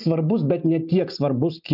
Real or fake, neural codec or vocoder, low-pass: real; none; 5.4 kHz